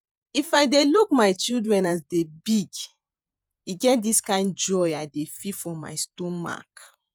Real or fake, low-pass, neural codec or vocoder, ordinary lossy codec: fake; none; vocoder, 48 kHz, 128 mel bands, Vocos; none